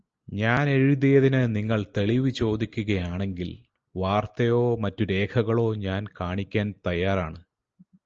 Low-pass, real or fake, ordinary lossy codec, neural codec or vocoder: 7.2 kHz; real; Opus, 24 kbps; none